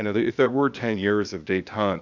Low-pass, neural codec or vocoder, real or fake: 7.2 kHz; codec, 16 kHz, 0.8 kbps, ZipCodec; fake